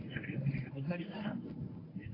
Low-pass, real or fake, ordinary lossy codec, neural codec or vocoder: 5.4 kHz; fake; AAC, 24 kbps; codec, 24 kHz, 0.9 kbps, WavTokenizer, medium speech release version 1